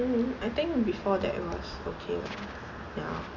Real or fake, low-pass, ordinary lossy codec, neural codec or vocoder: real; 7.2 kHz; none; none